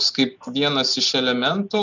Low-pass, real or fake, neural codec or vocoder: 7.2 kHz; real; none